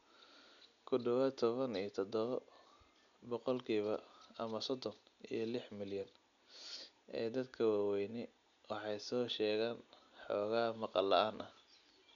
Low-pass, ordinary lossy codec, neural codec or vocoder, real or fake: 7.2 kHz; none; none; real